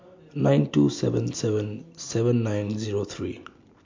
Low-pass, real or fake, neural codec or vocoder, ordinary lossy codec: 7.2 kHz; real; none; MP3, 48 kbps